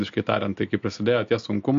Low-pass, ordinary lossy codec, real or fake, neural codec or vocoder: 7.2 kHz; MP3, 48 kbps; real; none